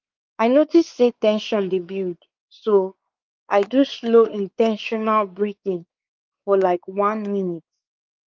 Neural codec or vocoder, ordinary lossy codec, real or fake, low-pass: codec, 16 kHz, 4 kbps, X-Codec, WavLM features, trained on Multilingual LibriSpeech; Opus, 32 kbps; fake; 7.2 kHz